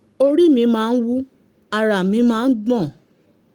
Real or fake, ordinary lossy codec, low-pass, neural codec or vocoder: fake; Opus, 32 kbps; 19.8 kHz; codec, 44.1 kHz, 7.8 kbps, Pupu-Codec